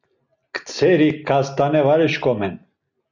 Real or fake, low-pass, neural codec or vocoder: real; 7.2 kHz; none